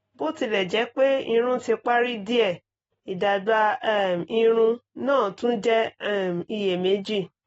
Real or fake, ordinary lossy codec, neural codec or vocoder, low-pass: real; AAC, 24 kbps; none; 10.8 kHz